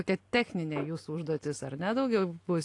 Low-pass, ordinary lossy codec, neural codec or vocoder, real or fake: 10.8 kHz; AAC, 48 kbps; none; real